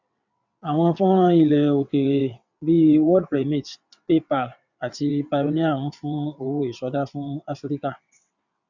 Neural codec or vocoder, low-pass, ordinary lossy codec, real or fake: vocoder, 22.05 kHz, 80 mel bands, WaveNeXt; 7.2 kHz; none; fake